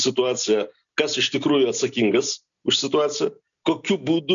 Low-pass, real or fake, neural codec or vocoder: 7.2 kHz; real; none